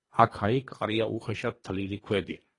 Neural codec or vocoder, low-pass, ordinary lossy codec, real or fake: codec, 24 kHz, 3 kbps, HILCodec; 10.8 kHz; AAC, 32 kbps; fake